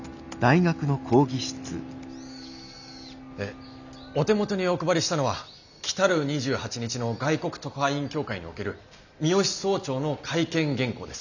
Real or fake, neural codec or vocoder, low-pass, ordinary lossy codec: real; none; 7.2 kHz; none